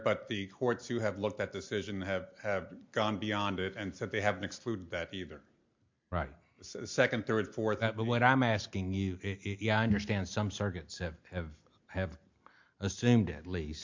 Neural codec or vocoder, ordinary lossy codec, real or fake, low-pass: none; MP3, 48 kbps; real; 7.2 kHz